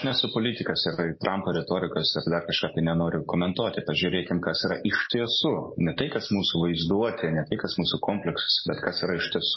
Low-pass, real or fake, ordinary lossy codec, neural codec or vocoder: 7.2 kHz; real; MP3, 24 kbps; none